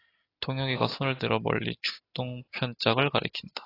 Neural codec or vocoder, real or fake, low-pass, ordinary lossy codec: none; real; 5.4 kHz; AAC, 24 kbps